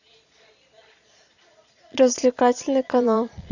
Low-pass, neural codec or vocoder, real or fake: 7.2 kHz; vocoder, 22.05 kHz, 80 mel bands, Vocos; fake